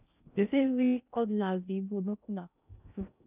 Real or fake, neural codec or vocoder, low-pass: fake; codec, 16 kHz in and 24 kHz out, 0.6 kbps, FocalCodec, streaming, 2048 codes; 3.6 kHz